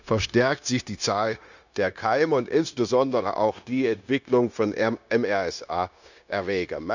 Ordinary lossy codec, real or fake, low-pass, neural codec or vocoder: none; fake; 7.2 kHz; codec, 16 kHz, 0.9 kbps, LongCat-Audio-Codec